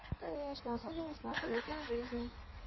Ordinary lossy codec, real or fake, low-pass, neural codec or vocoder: MP3, 24 kbps; fake; 7.2 kHz; codec, 16 kHz in and 24 kHz out, 1.1 kbps, FireRedTTS-2 codec